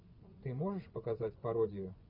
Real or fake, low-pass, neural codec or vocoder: fake; 5.4 kHz; vocoder, 24 kHz, 100 mel bands, Vocos